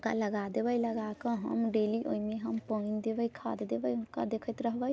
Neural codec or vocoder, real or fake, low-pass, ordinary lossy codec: none; real; none; none